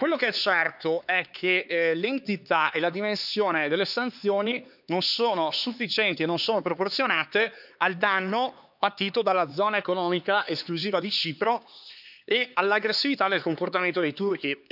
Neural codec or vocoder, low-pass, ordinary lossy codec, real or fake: codec, 16 kHz, 4 kbps, X-Codec, HuBERT features, trained on LibriSpeech; 5.4 kHz; none; fake